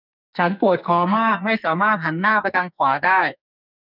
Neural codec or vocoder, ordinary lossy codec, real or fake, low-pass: codec, 44.1 kHz, 2.6 kbps, SNAC; none; fake; 5.4 kHz